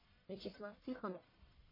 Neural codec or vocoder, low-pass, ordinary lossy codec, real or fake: codec, 44.1 kHz, 1.7 kbps, Pupu-Codec; 5.4 kHz; MP3, 24 kbps; fake